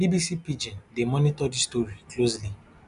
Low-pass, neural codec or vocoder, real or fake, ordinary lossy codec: 10.8 kHz; none; real; none